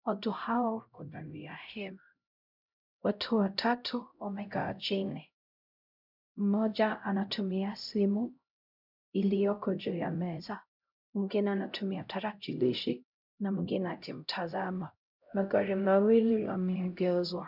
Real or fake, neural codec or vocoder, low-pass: fake; codec, 16 kHz, 0.5 kbps, X-Codec, HuBERT features, trained on LibriSpeech; 5.4 kHz